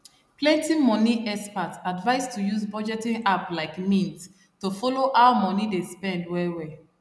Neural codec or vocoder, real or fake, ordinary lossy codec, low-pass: none; real; none; none